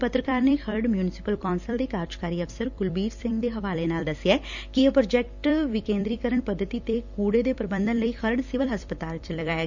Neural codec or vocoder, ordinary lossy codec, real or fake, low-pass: vocoder, 44.1 kHz, 128 mel bands every 256 samples, BigVGAN v2; none; fake; 7.2 kHz